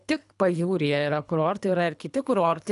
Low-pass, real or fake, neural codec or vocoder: 10.8 kHz; fake; codec, 24 kHz, 3 kbps, HILCodec